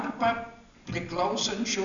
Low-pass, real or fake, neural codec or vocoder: 7.2 kHz; real; none